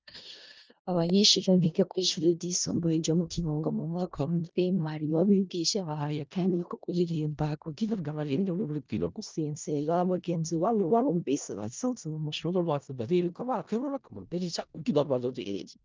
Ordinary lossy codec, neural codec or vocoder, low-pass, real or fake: Opus, 24 kbps; codec, 16 kHz in and 24 kHz out, 0.4 kbps, LongCat-Audio-Codec, four codebook decoder; 7.2 kHz; fake